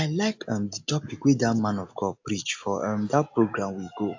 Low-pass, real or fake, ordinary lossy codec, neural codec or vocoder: 7.2 kHz; real; AAC, 48 kbps; none